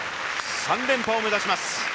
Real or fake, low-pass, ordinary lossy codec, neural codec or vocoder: real; none; none; none